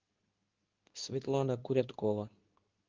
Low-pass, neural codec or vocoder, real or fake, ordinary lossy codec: 7.2 kHz; codec, 24 kHz, 0.9 kbps, WavTokenizer, medium speech release version 2; fake; Opus, 32 kbps